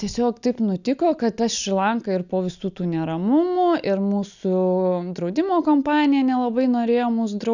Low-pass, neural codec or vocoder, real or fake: 7.2 kHz; none; real